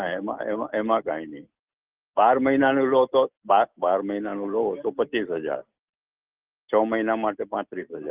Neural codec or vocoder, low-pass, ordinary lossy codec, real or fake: none; 3.6 kHz; Opus, 32 kbps; real